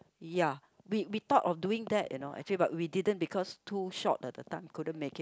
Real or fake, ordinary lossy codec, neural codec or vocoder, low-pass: real; none; none; none